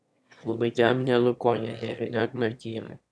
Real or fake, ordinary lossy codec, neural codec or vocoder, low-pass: fake; none; autoencoder, 22.05 kHz, a latent of 192 numbers a frame, VITS, trained on one speaker; none